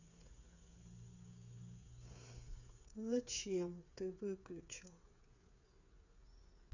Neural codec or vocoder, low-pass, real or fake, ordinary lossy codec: codec, 16 kHz, 8 kbps, FreqCodec, smaller model; 7.2 kHz; fake; none